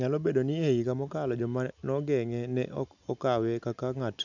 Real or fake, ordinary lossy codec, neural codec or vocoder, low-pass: real; none; none; 7.2 kHz